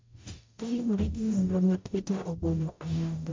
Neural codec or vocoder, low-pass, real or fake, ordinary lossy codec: codec, 44.1 kHz, 0.9 kbps, DAC; 7.2 kHz; fake; MP3, 48 kbps